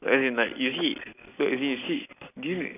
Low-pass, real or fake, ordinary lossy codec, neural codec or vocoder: 3.6 kHz; real; none; none